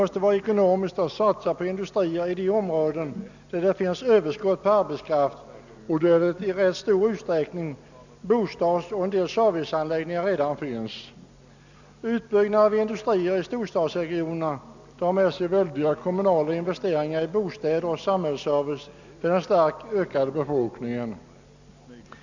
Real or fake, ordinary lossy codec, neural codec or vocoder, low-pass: real; none; none; 7.2 kHz